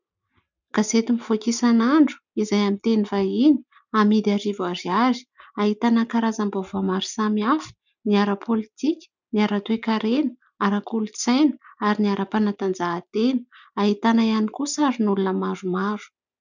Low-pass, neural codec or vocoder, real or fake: 7.2 kHz; none; real